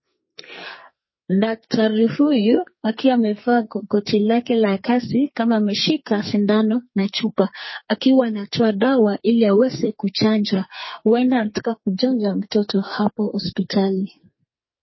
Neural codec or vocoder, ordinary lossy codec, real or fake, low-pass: codec, 44.1 kHz, 2.6 kbps, SNAC; MP3, 24 kbps; fake; 7.2 kHz